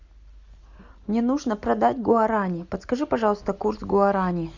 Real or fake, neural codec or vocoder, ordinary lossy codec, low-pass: real; none; Opus, 64 kbps; 7.2 kHz